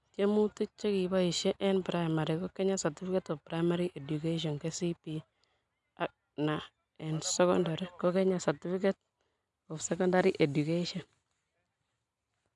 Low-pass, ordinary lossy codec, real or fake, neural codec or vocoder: 10.8 kHz; none; real; none